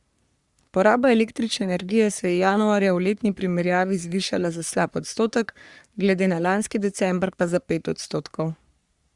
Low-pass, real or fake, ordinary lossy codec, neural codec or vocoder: 10.8 kHz; fake; Opus, 64 kbps; codec, 44.1 kHz, 3.4 kbps, Pupu-Codec